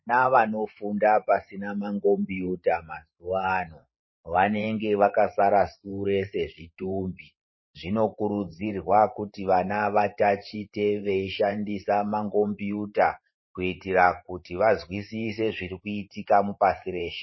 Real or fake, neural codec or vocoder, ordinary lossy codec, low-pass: fake; vocoder, 44.1 kHz, 128 mel bands every 512 samples, BigVGAN v2; MP3, 24 kbps; 7.2 kHz